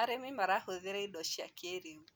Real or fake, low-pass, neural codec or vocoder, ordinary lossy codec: real; none; none; none